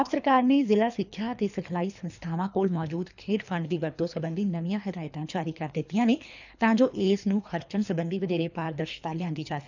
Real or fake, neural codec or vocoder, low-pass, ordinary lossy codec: fake; codec, 24 kHz, 3 kbps, HILCodec; 7.2 kHz; none